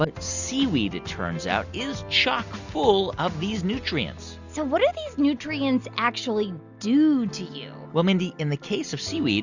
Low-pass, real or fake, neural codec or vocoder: 7.2 kHz; real; none